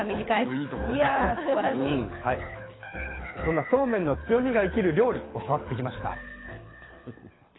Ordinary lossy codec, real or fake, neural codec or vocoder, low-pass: AAC, 16 kbps; fake; codec, 24 kHz, 6 kbps, HILCodec; 7.2 kHz